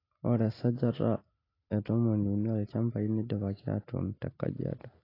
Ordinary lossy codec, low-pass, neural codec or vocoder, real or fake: AAC, 24 kbps; 5.4 kHz; none; real